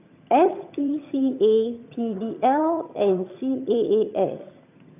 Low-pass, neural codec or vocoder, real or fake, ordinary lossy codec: 3.6 kHz; vocoder, 22.05 kHz, 80 mel bands, HiFi-GAN; fake; none